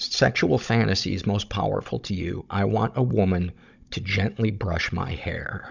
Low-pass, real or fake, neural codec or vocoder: 7.2 kHz; fake; codec, 16 kHz, 16 kbps, FunCodec, trained on Chinese and English, 50 frames a second